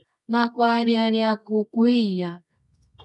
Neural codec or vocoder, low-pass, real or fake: codec, 24 kHz, 0.9 kbps, WavTokenizer, medium music audio release; 10.8 kHz; fake